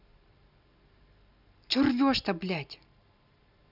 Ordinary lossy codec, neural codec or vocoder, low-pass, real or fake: none; none; 5.4 kHz; real